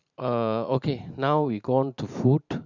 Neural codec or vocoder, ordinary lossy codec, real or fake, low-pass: vocoder, 44.1 kHz, 128 mel bands every 512 samples, BigVGAN v2; none; fake; 7.2 kHz